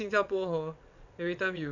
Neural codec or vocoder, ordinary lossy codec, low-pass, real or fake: vocoder, 22.05 kHz, 80 mel bands, WaveNeXt; none; 7.2 kHz; fake